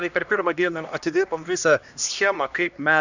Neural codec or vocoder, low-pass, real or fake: codec, 16 kHz, 1 kbps, X-Codec, HuBERT features, trained on LibriSpeech; 7.2 kHz; fake